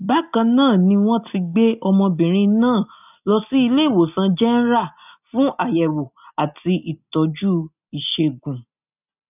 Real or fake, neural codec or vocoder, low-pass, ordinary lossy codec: real; none; 3.6 kHz; none